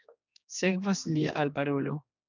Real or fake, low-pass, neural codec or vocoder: fake; 7.2 kHz; codec, 16 kHz, 2 kbps, X-Codec, HuBERT features, trained on general audio